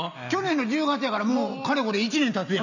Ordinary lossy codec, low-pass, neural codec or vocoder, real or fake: none; 7.2 kHz; none; real